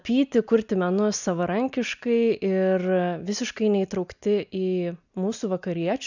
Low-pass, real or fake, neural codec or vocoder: 7.2 kHz; real; none